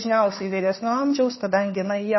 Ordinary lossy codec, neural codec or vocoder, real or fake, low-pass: MP3, 24 kbps; codec, 16 kHz, 2 kbps, FunCodec, trained on Chinese and English, 25 frames a second; fake; 7.2 kHz